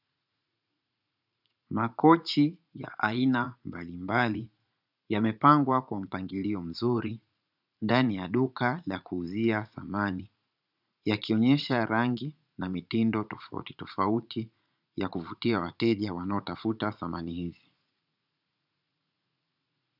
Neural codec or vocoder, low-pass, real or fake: vocoder, 44.1 kHz, 80 mel bands, Vocos; 5.4 kHz; fake